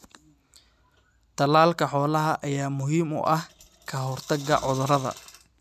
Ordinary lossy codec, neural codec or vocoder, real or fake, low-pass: none; none; real; 14.4 kHz